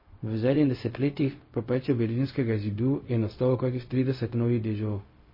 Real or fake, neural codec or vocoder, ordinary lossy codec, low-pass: fake; codec, 16 kHz, 0.4 kbps, LongCat-Audio-Codec; MP3, 24 kbps; 5.4 kHz